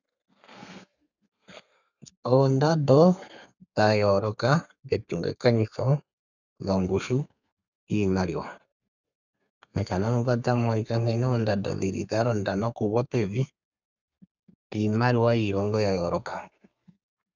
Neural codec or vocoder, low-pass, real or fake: codec, 32 kHz, 1.9 kbps, SNAC; 7.2 kHz; fake